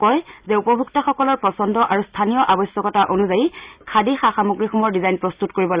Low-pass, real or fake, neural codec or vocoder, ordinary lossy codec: 3.6 kHz; real; none; Opus, 64 kbps